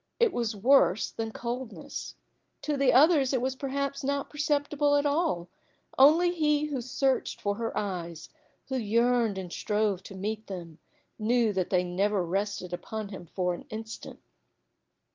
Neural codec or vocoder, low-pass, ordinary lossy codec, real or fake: none; 7.2 kHz; Opus, 32 kbps; real